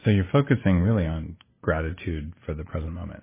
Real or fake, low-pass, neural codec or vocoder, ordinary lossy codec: real; 3.6 kHz; none; MP3, 16 kbps